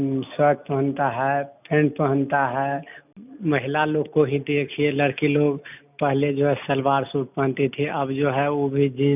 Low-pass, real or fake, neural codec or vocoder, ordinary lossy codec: 3.6 kHz; real; none; none